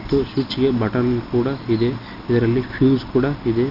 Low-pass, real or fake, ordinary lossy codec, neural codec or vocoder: 5.4 kHz; real; none; none